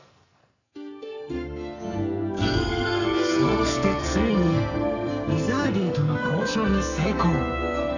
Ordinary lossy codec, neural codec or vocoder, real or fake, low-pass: none; codec, 44.1 kHz, 2.6 kbps, SNAC; fake; 7.2 kHz